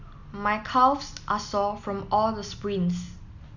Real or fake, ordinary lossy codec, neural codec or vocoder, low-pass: real; none; none; 7.2 kHz